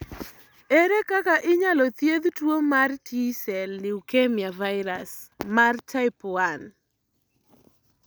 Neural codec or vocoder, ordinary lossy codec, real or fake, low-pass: none; none; real; none